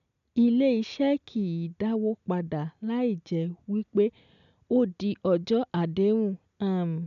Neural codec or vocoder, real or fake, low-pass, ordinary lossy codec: none; real; 7.2 kHz; none